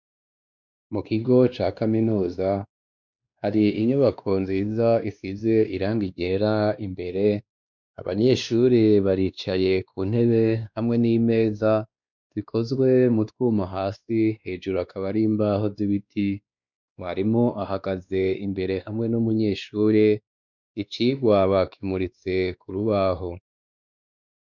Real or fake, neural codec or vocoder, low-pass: fake; codec, 16 kHz, 2 kbps, X-Codec, WavLM features, trained on Multilingual LibriSpeech; 7.2 kHz